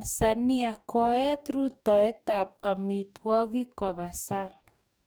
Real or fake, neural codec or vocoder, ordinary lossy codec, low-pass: fake; codec, 44.1 kHz, 2.6 kbps, DAC; none; none